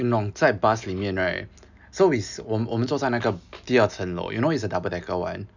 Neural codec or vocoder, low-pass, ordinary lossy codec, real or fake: none; 7.2 kHz; none; real